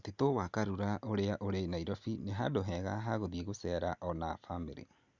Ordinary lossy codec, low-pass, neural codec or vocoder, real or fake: Opus, 64 kbps; 7.2 kHz; none; real